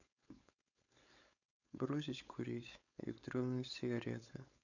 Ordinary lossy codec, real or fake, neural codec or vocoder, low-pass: none; fake; codec, 16 kHz, 4.8 kbps, FACodec; 7.2 kHz